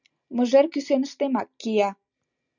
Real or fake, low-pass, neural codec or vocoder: real; 7.2 kHz; none